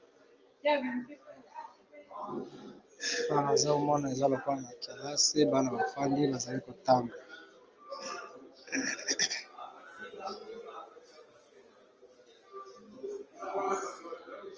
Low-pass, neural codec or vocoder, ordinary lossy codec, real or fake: 7.2 kHz; none; Opus, 24 kbps; real